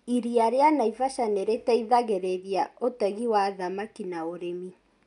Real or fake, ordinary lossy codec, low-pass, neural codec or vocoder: real; none; 10.8 kHz; none